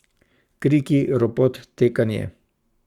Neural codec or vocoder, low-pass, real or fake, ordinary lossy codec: codec, 44.1 kHz, 7.8 kbps, Pupu-Codec; 19.8 kHz; fake; Opus, 64 kbps